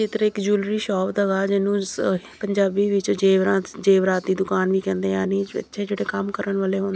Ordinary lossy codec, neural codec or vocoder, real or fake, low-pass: none; none; real; none